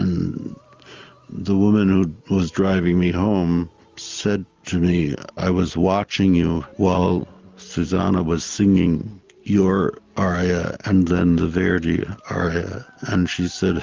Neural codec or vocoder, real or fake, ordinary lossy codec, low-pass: none; real; Opus, 32 kbps; 7.2 kHz